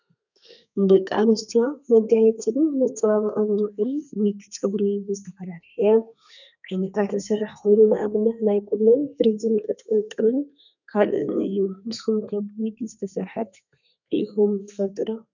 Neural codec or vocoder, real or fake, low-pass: codec, 32 kHz, 1.9 kbps, SNAC; fake; 7.2 kHz